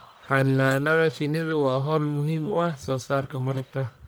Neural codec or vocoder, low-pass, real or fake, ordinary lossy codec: codec, 44.1 kHz, 1.7 kbps, Pupu-Codec; none; fake; none